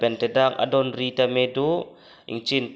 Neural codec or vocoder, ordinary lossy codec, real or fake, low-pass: none; none; real; none